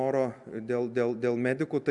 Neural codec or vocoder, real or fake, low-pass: none; real; 10.8 kHz